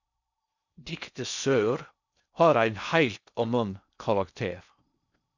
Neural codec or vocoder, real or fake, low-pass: codec, 16 kHz in and 24 kHz out, 0.6 kbps, FocalCodec, streaming, 4096 codes; fake; 7.2 kHz